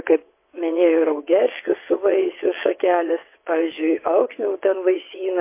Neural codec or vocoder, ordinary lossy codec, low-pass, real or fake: vocoder, 22.05 kHz, 80 mel bands, WaveNeXt; MP3, 24 kbps; 3.6 kHz; fake